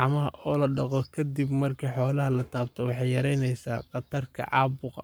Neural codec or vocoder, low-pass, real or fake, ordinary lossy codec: codec, 44.1 kHz, 7.8 kbps, Pupu-Codec; none; fake; none